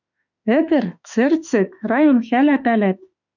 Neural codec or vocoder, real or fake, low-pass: autoencoder, 48 kHz, 32 numbers a frame, DAC-VAE, trained on Japanese speech; fake; 7.2 kHz